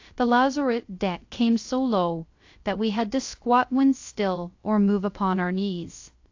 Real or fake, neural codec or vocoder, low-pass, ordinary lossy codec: fake; codec, 16 kHz, 0.3 kbps, FocalCodec; 7.2 kHz; AAC, 48 kbps